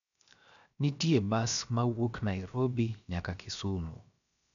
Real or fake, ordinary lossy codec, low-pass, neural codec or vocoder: fake; MP3, 96 kbps; 7.2 kHz; codec, 16 kHz, 0.7 kbps, FocalCodec